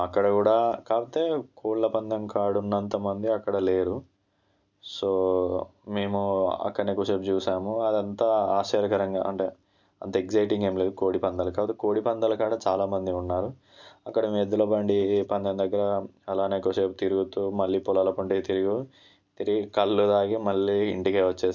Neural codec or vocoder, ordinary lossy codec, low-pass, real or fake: none; none; 7.2 kHz; real